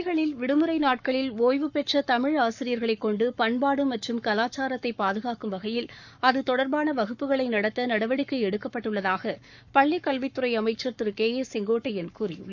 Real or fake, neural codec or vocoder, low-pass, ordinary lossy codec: fake; codec, 44.1 kHz, 7.8 kbps, Pupu-Codec; 7.2 kHz; none